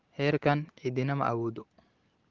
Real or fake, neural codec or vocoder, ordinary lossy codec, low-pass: real; none; Opus, 16 kbps; 7.2 kHz